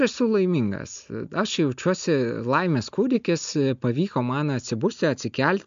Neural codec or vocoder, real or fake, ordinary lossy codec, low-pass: none; real; MP3, 64 kbps; 7.2 kHz